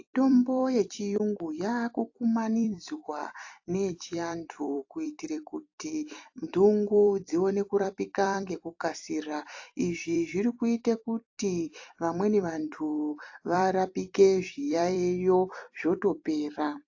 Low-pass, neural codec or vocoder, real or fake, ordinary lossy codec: 7.2 kHz; vocoder, 44.1 kHz, 128 mel bands every 256 samples, BigVGAN v2; fake; AAC, 48 kbps